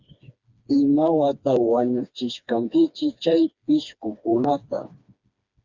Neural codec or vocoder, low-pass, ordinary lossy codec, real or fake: codec, 16 kHz, 2 kbps, FreqCodec, smaller model; 7.2 kHz; Opus, 64 kbps; fake